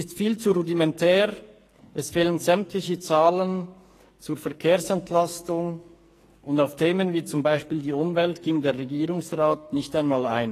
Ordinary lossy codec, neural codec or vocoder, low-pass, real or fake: AAC, 48 kbps; codec, 44.1 kHz, 2.6 kbps, SNAC; 14.4 kHz; fake